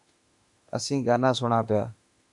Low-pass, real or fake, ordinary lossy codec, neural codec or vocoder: 10.8 kHz; fake; MP3, 96 kbps; autoencoder, 48 kHz, 32 numbers a frame, DAC-VAE, trained on Japanese speech